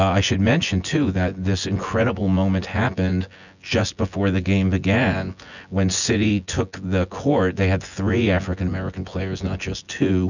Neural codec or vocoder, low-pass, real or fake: vocoder, 24 kHz, 100 mel bands, Vocos; 7.2 kHz; fake